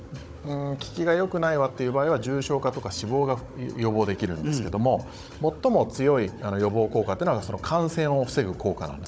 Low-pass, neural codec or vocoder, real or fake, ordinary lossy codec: none; codec, 16 kHz, 16 kbps, FunCodec, trained on Chinese and English, 50 frames a second; fake; none